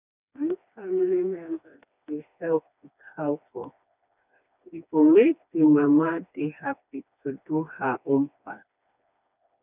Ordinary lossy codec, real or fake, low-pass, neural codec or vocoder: none; fake; 3.6 kHz; codec, 16 kHz, 2 kbps, FreqCodec, smaller model